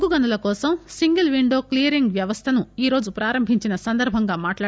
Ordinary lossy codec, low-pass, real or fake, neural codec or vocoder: none; none; real; none